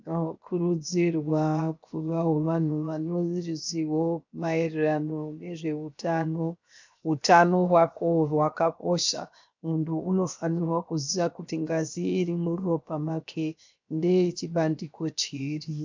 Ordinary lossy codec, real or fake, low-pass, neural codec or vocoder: MP3, 64 kbps; fake; 7.2 kHz; codec, 16 kHz, 0.7 kbps, FocalCodec